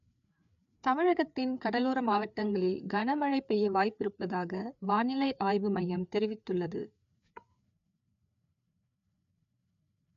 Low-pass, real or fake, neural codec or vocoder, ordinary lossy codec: 7.2 kHz; fake; codec, 16 kHz, 4 kbps, FreqCodec, larger model; none